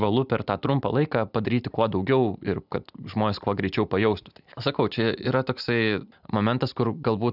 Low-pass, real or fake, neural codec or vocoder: 5.4 kHz; real; none